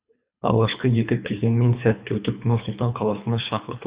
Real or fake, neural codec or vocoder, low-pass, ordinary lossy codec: fake; codec, 24 kHz, 3 kbps, HILCodec; 3.6 kHz; Opus, 64 kbps